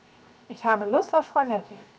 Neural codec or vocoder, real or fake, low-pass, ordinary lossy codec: codec, 16 kHz, 0.7 kbps, FocalCodec; fake; none; none